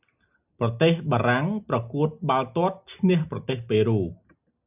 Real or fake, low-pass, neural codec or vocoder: real; 3.6 kHz; none